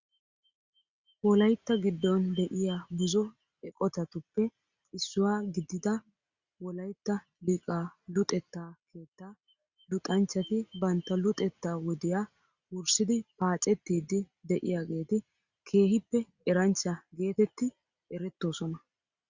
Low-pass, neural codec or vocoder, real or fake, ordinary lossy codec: 7.2 kHz; none; real; Opus, 64 kbps